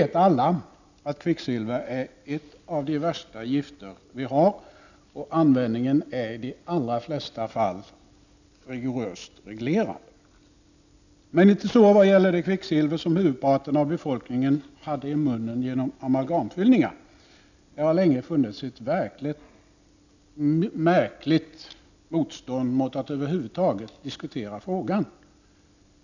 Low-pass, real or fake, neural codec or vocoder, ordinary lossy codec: 7.2 kHz; real; none; none